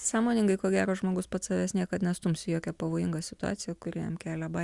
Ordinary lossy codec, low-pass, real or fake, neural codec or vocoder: Opus, 64 kbps; 10.8 kHz; real; none